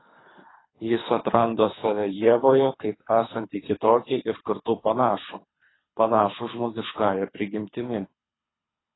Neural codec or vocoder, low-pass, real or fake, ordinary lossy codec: codec, 24 kHz, 3 kbps, HILCodec; 7.2 kHz; fake; AAC, 16 kbps